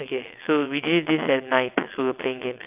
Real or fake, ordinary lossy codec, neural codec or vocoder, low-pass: fake; none; vocoder, 22.05 kHz, 80 mel bands, WaveNeXt; 3.6 kHz